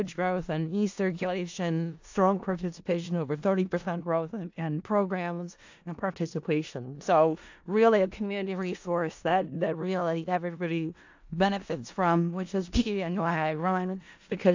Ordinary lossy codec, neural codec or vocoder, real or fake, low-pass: AAC, 48 kbps; codec, 16 kHz in and 24 kHz out, 0.4 kbps, LongCat-Audio-Codec, four codebook decoder; fake; 7.2 kHz